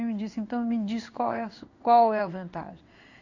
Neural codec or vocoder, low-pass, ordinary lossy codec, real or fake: codec, 16 kHz in and 24 kHz out, 1 kbps, XY-Tokenizer; 7.2 kHz; none; fake